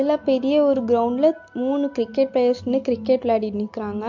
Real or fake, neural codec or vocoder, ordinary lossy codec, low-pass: real; none; MP3, 48 kbps; 7.2 kHz